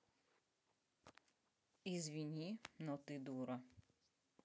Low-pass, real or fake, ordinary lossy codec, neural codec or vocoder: none; real; none; none